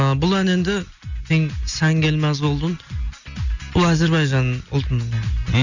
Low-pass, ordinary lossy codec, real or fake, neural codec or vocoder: 7.2 kHz; none; real; none